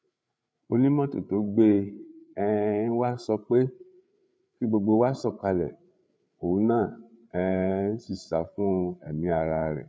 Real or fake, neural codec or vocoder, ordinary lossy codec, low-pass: fake; codec, 16 kHz, 8 kbps, FreqCodec, larger model; none; none